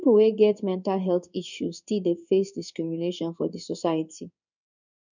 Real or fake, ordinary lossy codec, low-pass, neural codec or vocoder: fake; none; 7.2 kHz; codec, 16 kHz in and 24 kHz out, 1 kbps, XY-Tokenizer